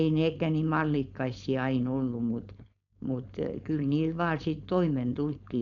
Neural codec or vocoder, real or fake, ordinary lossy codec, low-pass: codec, 16 kHz, 4.8 kbps, FACodec; fake; none; 7.2 kHz